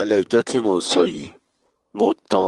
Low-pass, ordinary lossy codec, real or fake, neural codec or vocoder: 10.8 kHz; Opus, 24 kbps; fake; codec, 24 kHz, 1 kbps, SNAC